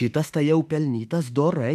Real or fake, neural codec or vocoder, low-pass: fake; autoencoder, 48 kHz, 32 numbers a frame, DAC-VAE, trained on Japanese speech; 14.4 kHz